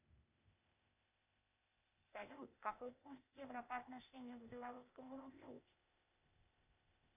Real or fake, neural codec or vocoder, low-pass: fake; codec, 16 kHz, 0.8 kbps, ZipCodec; 3.6 kHz